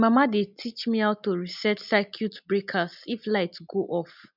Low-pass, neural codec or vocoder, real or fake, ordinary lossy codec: 5.4 kHz; none; real; none